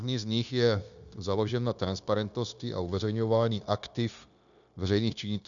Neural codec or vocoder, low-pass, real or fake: codec, 16 kHz, 0.9 kbps, LongCat-Audio-Codec; 7.2 kHz; fake